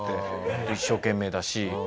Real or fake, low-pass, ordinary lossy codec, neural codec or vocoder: real; none; none; none